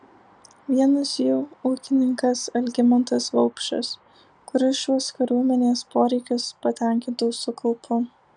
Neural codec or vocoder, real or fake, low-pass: none; real; 9.9 kHz